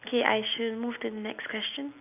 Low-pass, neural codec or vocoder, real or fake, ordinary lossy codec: 3.6 kHz; none; real; none